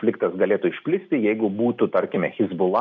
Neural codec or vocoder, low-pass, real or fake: none; 7.2 kHz; real